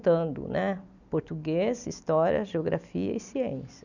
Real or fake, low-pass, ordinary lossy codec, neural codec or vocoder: real; 7.2 kHz; none; none